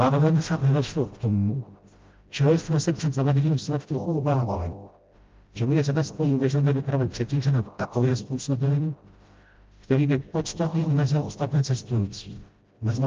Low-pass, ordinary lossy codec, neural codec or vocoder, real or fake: 7.2 kHz; Opus, 24 kbps; codec, 16 kHz, 0.5 kbps, FreqCodec, smaller model; fake